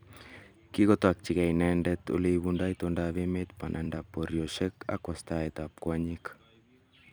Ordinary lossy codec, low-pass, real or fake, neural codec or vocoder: none; none; fake; vocoder, 44.1 kHz, 128 mel bands every 256 samples, BigVGAN v2